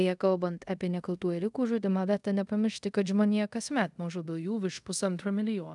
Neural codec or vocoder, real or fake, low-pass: codec, 24 kHz, 0.5 kbps, DualCodec; fake; 10.8 kHz